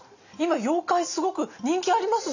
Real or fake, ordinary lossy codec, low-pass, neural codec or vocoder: real; none; 7.2 kHz; none